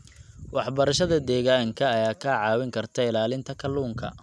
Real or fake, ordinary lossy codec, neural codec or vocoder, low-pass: real; none; none; none